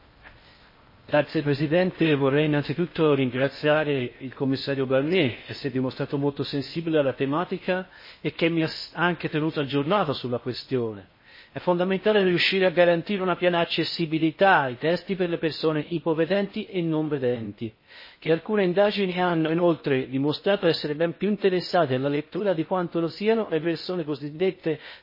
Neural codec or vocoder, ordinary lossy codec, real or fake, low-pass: codec, 16 kHz in and 24 kHz out, 0.6 kbps, FocalCodec, streaming, 4096 codes; MP3, 24 kbps; fake; 5.4 kHz